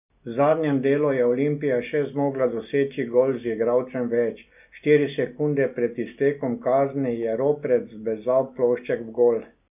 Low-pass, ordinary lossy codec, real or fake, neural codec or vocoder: 3.6 kHz; none; real; none